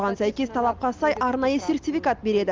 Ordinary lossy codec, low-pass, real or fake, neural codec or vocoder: Opus, 32 kbps; 7.2 kHz; real; none